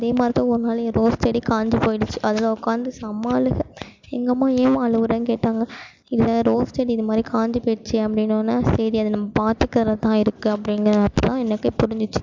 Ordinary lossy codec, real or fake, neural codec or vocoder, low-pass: MP3, 64 kbps; real; none; 7.2 kHz